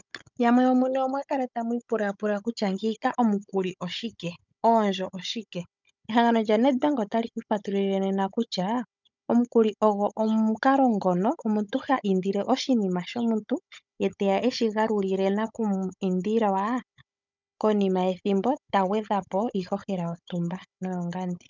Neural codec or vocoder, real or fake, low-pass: codec, 16 kHz, 16 kbps, FunCodec, trained on Chinese and English, 50 frames a second; fake; 7.2 kHz